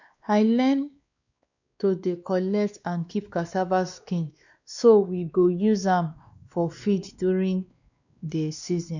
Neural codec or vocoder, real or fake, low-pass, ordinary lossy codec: codec, 16 kHz, 2 kbps, X-Codec, WavLM features, trained on Multilingual LibriSpeech; fake; 7.2 kHz; none